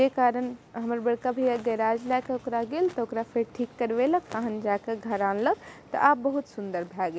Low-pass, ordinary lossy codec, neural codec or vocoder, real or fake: none; none; none; real